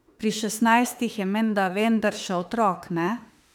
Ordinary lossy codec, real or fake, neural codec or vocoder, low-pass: none; fake; autoencoder, 48 kHz, 32 numbers a frame, DAC-VAE, trained on Japanese speech; 19.8 kHz